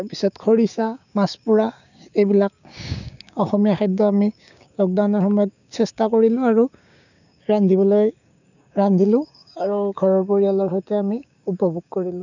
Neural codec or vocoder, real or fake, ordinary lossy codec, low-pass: codec, 16 kHz, 6 kbps, DAC; fake; none; 7.2 kHz